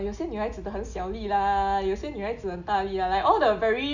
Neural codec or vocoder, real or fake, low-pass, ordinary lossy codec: none; real; 7.2 kHz; none